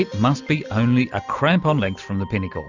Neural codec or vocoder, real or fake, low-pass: none; real; 7.2 kHz